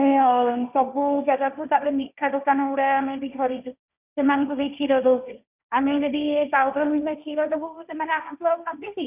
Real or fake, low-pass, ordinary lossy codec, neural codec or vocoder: fake; 3.6 kHz; none; codec, 16 kHz, 1.1 kbps, Voila-Tokenizer